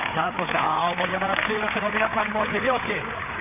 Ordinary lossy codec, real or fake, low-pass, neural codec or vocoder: none; fake; 3.6 kHz; codec, 16 kHz, 4 kbps, FreqCodec, larger model